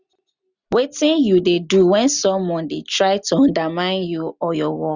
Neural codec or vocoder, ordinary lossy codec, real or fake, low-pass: none; none; real; 7.2 kHz